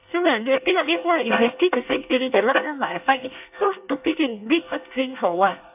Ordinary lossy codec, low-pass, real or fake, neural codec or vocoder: none; 3.6 kHz; fake; codec, 24 kHz, 1 kbps, SNAC